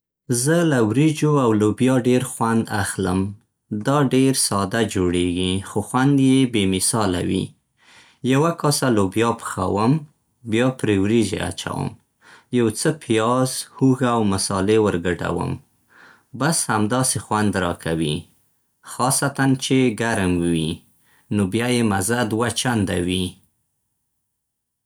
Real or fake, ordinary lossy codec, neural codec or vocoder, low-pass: real; none; none; none